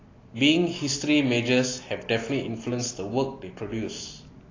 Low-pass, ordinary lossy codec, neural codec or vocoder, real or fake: 7.2 kHz; AAC, 32 kbps; none; real